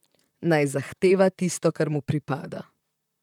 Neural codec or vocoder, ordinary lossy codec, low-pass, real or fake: vocoder, 44.1 kHz, 128 mel bands, Pupu-Vocoder; none; 19.8 kHz; fake